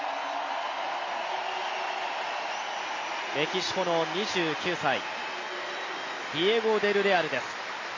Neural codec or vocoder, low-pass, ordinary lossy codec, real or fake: none; 7.2 kHz; MP3, 32 kbps; real